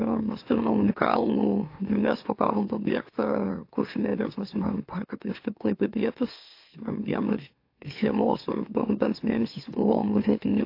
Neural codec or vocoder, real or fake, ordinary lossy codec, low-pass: autoencoder, 44.1 kHz, a latent of 192 numbers a frame, MeloTTS; fake; AAC, 32 kbps; 5.4 kHz